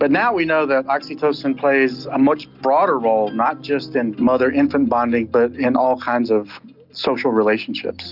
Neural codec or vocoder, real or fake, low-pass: none; real; 5.4 kHz